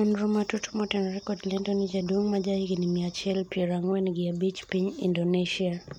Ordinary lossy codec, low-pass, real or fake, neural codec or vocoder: MP3, 96 kbps; 19.8 kHz; real; none